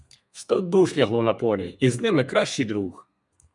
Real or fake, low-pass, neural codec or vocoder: fake; 10.8 kHz; codec, 32 kHz, 1.9 kbps, SNAC